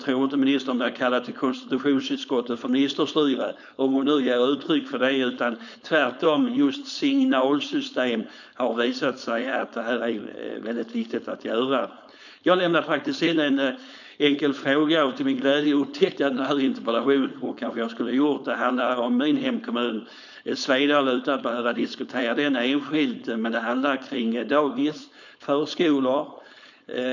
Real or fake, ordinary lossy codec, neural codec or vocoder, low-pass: fake; none; codec, 16 kHz, 4.8 kbps, FACodec; 7.2 kHz